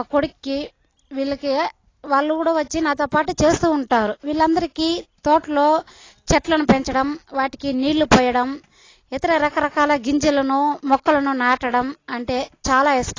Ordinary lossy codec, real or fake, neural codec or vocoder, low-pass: AAC, 32 kbps; real; none; 7.2 kHz